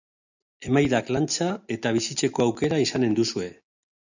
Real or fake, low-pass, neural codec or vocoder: real; 7.2 kHz; none